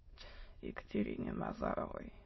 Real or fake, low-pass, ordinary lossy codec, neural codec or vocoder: fake; 7.2 kHz; MP3, 24 kbps; autoencoder, 22.05 kHz, a latent of 192 numbers a frame, VITS, trained on many speakers